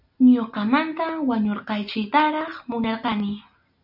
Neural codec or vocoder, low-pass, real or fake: none; 5.4 kHz; real